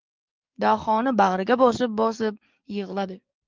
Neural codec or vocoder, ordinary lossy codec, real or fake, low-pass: codec, 44.1 kHz, 7.8 kbps, DAC; Opus, 24 kbps; fake; 7.2 kHz